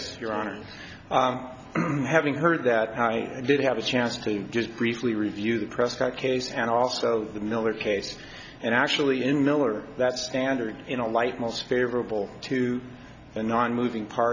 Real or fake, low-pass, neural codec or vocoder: real; 7.2 kHz; none